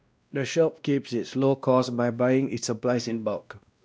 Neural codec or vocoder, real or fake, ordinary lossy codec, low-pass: codec, 16 kHz, 1 kbps, X-Codec, WavLM features, trained on Multilingual LibriSpeech; fake; none; none